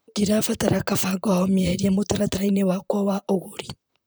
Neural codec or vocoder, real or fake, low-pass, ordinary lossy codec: vocoder, 44.1 kHz, 128 mel bands, Pupu-Vocoder; fake; none; none